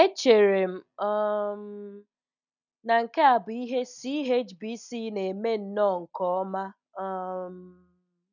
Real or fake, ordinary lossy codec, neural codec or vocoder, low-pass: real; none; none; 7.2 kHz